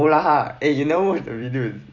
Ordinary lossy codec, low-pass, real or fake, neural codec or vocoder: none; 7.2 kHz; fake; vocoder, 44.1 kHz, 128 mel bands every 256 samples, BigVGAN v2